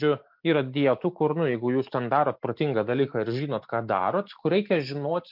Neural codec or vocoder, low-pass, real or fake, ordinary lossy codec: none; 5.4 kHz; real; MP3, 48 kbps